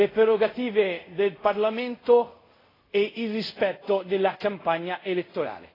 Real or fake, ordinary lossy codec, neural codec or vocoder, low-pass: fake; AAC, 24 kbps; codec, 24 kHz, 0.5 kbps, DualCodec; 5.4 kHz